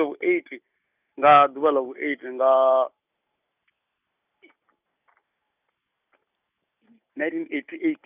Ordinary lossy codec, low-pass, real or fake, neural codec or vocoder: none; 3.6 kHz; real; none